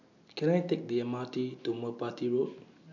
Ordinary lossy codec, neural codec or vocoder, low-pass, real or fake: none; none; 7.2 kHz; real